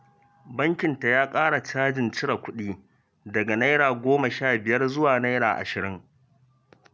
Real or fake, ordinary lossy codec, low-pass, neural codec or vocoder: real; none; none; none